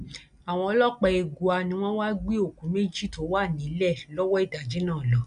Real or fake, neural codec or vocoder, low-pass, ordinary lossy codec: real; none; 9.9 kHz; none